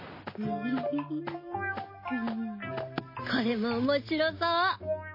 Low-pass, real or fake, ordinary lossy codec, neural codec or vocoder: 5.4 kHz; real; MP3, 24 kbps; none